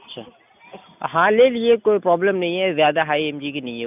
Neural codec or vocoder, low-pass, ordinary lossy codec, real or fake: none; 3.6 kHz; none; real